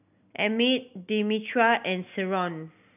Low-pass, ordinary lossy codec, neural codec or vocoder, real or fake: 3.6 kHz; none; none; real